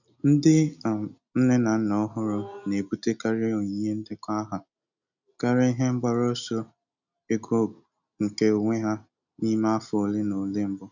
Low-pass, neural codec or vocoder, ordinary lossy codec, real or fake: 7.2 kHz; none; none; real